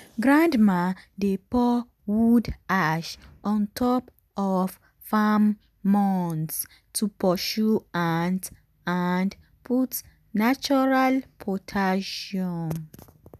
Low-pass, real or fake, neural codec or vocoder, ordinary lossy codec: 14.4 kHz; real; none; none